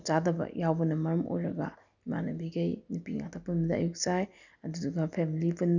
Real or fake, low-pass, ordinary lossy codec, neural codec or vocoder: real; 7.2 kHz; none; none